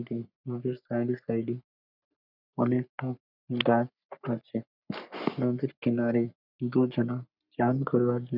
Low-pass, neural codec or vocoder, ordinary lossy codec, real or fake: 5.4 kHz; codec, 44.1 kHz, 3.4 kbps, Pupu-Codec; none; fake